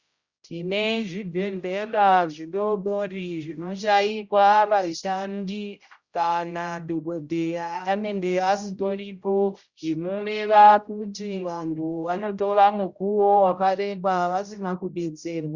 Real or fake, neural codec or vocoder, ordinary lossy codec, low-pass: fake; codec, 16 kHz, 0.5 kbps, X-Codec, HuBERT features, trained on general audio; Opus, 64 kbps; 7.2 kHz